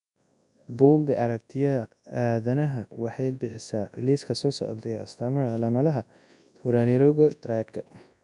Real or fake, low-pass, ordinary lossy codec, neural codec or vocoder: fake; 10.8 kHz; none; codec, 24 kHz, 0.9 kbps, WavTokenizer, large speech release